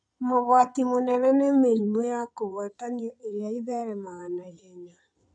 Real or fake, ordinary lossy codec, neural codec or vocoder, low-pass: fake; none; codec, 16 kHz in and 24 kHz out, 2.2 kbps, FireRedTTS-2 codec; 9.9 kHz